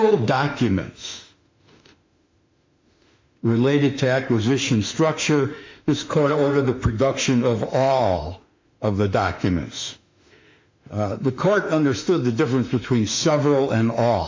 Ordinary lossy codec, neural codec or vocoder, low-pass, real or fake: MP3, 64 kbps; autoencoder, 48 kHz, 32 numbers a frame, DAC-VAE, trained on Japanese speech; 7.2 kHz; fake